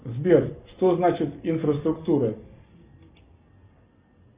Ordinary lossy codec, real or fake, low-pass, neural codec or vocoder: Opus, 64 kbps; real; 3.6 kHz; none